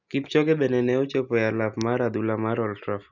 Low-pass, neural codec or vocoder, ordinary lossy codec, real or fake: 7.2 kHz; none; none; real